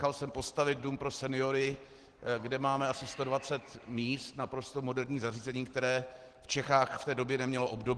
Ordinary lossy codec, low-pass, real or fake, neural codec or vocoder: Opus, 16 kbps; 9.9 kHz; real; none